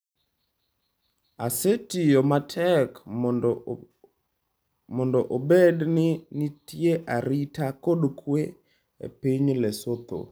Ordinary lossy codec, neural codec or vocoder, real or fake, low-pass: none; none; real; none